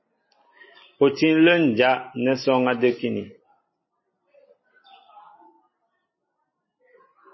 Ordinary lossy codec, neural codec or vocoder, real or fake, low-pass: MP3, 24 kbps; none; real; 7.2 kHz